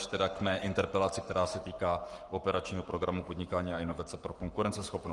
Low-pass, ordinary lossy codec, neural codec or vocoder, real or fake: 10.8 kHz; Opus, 32 kbps; codec, 44.1 kHz, 7.8 kbps, Pupu-Codec; fake